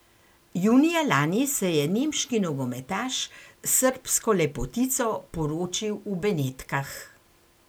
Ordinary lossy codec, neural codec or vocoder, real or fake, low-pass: none; none; real; none